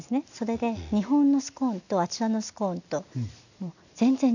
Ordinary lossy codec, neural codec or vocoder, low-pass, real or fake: none; none; 7.2 kHz; real